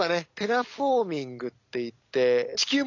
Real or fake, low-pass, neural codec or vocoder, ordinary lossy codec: real; 7.2 kHz; none; none